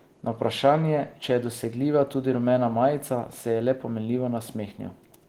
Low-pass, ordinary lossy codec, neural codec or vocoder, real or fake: 19.8 kHz; Opus, 16 kbps; none; real